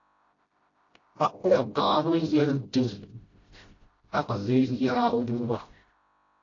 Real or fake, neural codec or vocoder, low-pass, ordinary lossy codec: fake; codec, 16 kHz, 0.5 kbps, FreqCodec, smaller model; 7.2 kHz; AAC, 32 kbps